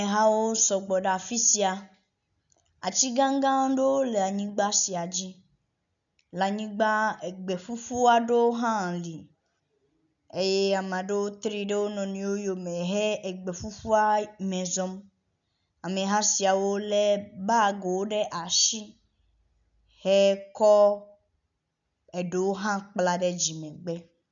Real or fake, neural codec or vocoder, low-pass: real; none; 7.2 kHz